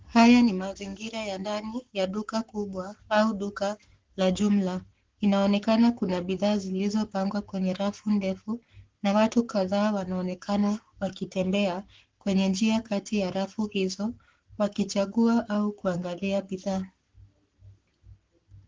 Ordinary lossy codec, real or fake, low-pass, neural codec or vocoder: Opus, 16 kbps; fake; 7.2 kHz; codec, 44.1 kHz, 7.8 kbps, Pupu-Codec